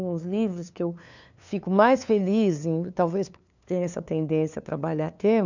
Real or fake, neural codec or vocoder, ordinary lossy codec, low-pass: fake; codec, 16 kHz, 2 kbps, FunCodec, trained on LibriTTS, 25 frames a second; none; 7.2 kHz